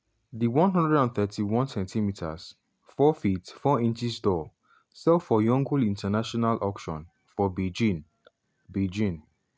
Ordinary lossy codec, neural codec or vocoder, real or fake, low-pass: none; none; real; none